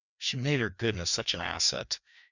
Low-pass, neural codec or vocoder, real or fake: 7.2 kHz; codec, 16 kHz, 2 kbps, FreqCodec, larger model; fake